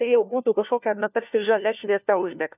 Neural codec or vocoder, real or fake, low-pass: codec, 16 kHz, 1 kbps, FunCodec, trained on LibriTTS, 50 frames a second; fake; 3.6 kHz